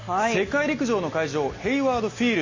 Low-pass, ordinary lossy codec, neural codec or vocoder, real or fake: 7.2 kHz; MP3, 32 kbps; none; real